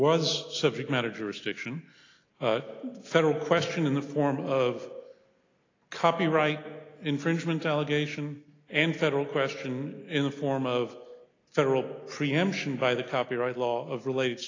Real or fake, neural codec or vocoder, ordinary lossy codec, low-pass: real; none; AAC, 32 kbps; 7.2 kHz